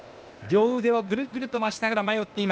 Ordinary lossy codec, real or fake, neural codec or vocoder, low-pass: none; fake; codec, 16 kHz, 0.8 kbps, ZipCodec; none